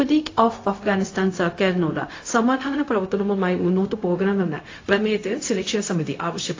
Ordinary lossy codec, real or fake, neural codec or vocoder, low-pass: AAC, 32 kbps; fake; codec, 16 kHz, 0.4 kbps, LongCat-Audio-Codec; 7.2 kHz